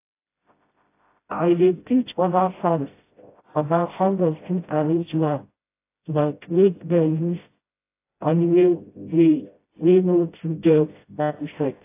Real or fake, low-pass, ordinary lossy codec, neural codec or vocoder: fake; 3.6 kHz; AAC, 24 kbps; codec, 16 kHz, 0.5 kbps, FreqCodec, smaller model